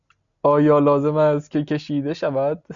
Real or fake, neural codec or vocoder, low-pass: real; none; 7.2 kHz